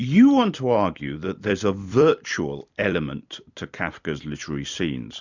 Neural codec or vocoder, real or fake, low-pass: vocoder, 44.1 kHz, 128 mel bands every 256 samples, BigVGAN v2; fake; 7.2 kHz